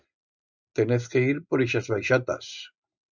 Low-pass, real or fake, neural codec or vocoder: 7.2 kHz; real; none